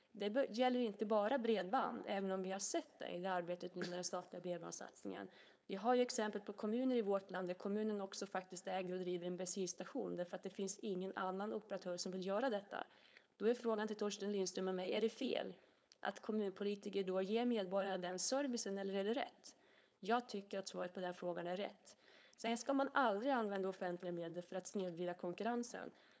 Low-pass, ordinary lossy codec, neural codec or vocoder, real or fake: none; none; codec, 16 kHz, 4.8 kbps, FACodec; fake